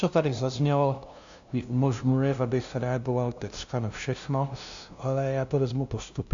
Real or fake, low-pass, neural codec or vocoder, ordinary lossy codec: fake; 7.2 kHz; codec, 16 kHz, 0.5 kbps, FunCodec, trained on LibriTTS, 25 frames a second; AAC, 64 kbps